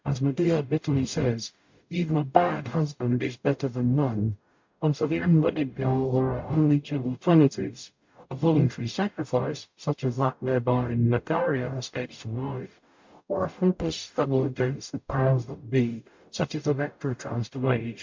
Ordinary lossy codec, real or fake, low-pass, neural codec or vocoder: MP3, 48 kbps; fake; 7.2 kHz; codec, 44.1 kHz, 0.9 kbps, DAC